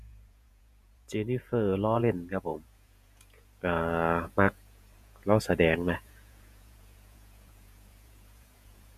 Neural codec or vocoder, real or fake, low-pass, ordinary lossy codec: vocoder, 48 kHz, 128 mel bands, Vocos; fake; 14.4 kHz; none